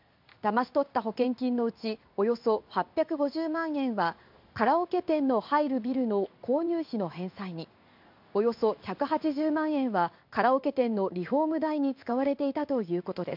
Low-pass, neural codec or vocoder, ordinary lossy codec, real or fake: 5.4 kHz; codec, 16 kHz in and 24 kHz out, 1 kbps, XY-Tokenizer; MP3, 48 kbps; fake